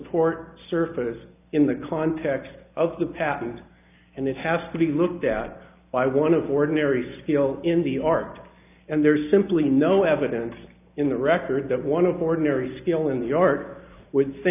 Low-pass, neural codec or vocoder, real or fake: 3.6 kHz; none; real